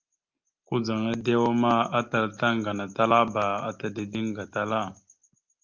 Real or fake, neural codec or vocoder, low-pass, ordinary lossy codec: real; none; 7.2 kHz; Opus, 24 kbps